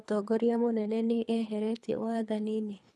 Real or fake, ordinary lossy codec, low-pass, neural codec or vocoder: fake; none; none; codec, 24 kHz, 3 kbps, HILCodec